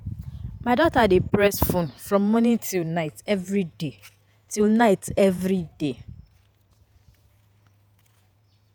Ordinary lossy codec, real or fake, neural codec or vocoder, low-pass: none; fake; vocoder, 48 kHz, 128 mel bands, Vocos; none